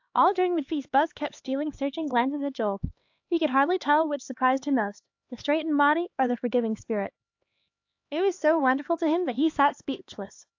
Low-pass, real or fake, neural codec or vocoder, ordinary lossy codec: 7.2 kHz; fake; codec, 16 kHz, 2 kbps, X-Codec, HuBERT features, trained on LibriSpeech; AAC, 48 kbps